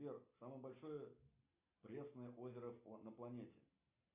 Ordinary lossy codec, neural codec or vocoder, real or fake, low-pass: MP3, 32 kbps; none; real; 3.6 kHz